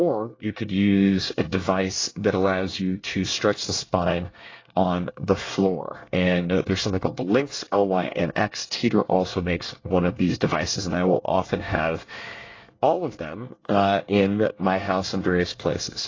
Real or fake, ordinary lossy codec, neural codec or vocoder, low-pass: fake; AAC, 32 kbps; codec, 24 kHz, 1 kbps, SNAC; 7.2 kHz